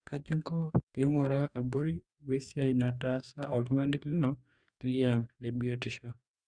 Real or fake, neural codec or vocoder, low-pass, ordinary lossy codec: fake; codec, 44.1 kHz, 2.6 kbps, DAC; 9.9 kHz; Opus, 64 kbps